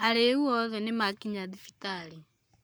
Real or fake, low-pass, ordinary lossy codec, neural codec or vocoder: fake; none; none; codec, 44.1 kHz, 7.8 kbps, Pupu-Codec